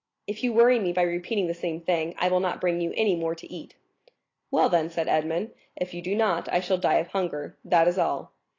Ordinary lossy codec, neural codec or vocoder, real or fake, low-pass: AAC, 32 kbps; none; real; 7.2 kHz